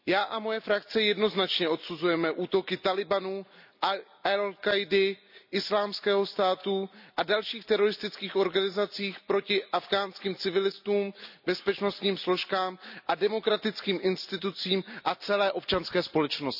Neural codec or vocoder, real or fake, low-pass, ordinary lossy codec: none; real; 5.4 kHz; none